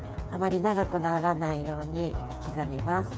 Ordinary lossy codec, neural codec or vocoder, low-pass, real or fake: none; codec, 16 kHz, 4 kbps, FreqCodec, smaller model; none; fake